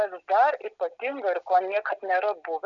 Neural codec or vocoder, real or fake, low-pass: none; real; 7.2 kHz